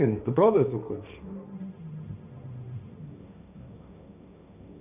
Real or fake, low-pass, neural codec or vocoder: fake; 3.6 kHz; codec, 16 kHz, 1.1 kbps, Voila-Tokenizer